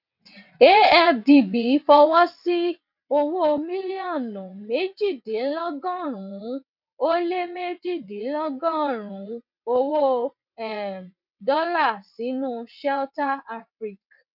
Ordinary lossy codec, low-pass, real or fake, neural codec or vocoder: none; 5.4 kHz; fake; vocoder, 22.05 kHz, 80 mel bands, WaveNeXt